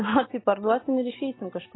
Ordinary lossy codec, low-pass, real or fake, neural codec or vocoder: AAC, 16 kbps; 7.2 kHz; real; none